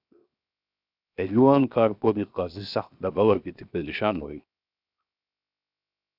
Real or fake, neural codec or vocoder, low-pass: fake; codec, 16 kHz, 0.7 kbps, FocalCodec; 5.4 kHz